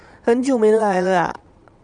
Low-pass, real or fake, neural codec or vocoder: 9.9 kHz; fake; vocoder, 22.05 kHz, 80 mel bands, Vocos